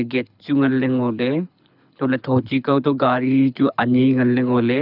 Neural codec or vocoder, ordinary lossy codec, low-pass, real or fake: codec, 24 kHz, 6 kbps, HILCodec; none; 5.4 kHz; fake